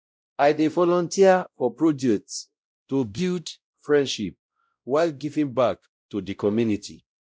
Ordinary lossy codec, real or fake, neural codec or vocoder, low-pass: none; fake; codec, 16 kHz, 0.5 kbps, X-Codec, WavLM features, trained on Multilingual LibriSpeech; none